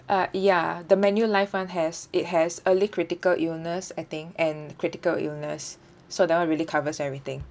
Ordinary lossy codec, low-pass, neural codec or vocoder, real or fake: none; none; none; real